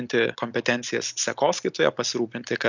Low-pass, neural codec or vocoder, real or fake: 7.2 kHz; none; real